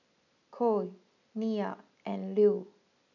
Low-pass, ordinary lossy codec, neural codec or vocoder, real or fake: 7.2 kHz; none; none; real